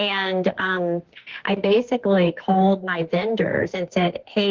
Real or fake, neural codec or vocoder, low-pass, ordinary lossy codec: fake; autoencoder, 48 kHz, 32 numbers a frame, DAC-VAE, trained on Japanese speech; 7.2 kHz; Opus, 16 kbps